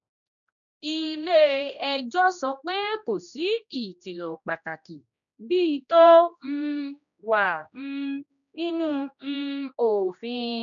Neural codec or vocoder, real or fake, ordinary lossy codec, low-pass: codec, 16 kHz, 1 kbps, X-Codec, HuBERT features, trained on general audio; fake; none; 7.2 kHz